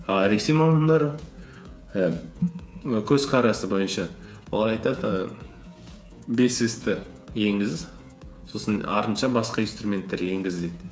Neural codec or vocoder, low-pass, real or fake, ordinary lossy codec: codec, 16 kHz, 8 kbps, FreqCodec, smaller model; none; fake; none